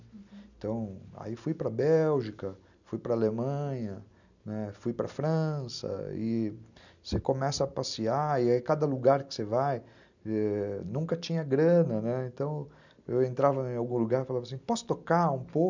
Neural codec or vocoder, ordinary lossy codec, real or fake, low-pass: none; none; real; 7.2 kHz